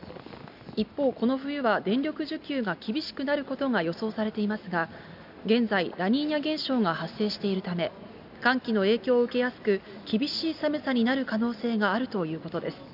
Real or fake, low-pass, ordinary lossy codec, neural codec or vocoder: real; 5.4 kHz; none; none